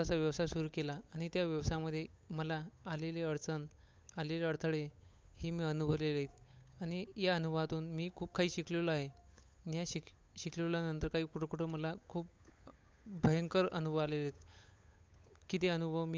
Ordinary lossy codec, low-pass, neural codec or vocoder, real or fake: none; none; codec, 16 kHz, 8 kbps, FunCodec, trained on Chinese and English, 25 frames a second; fake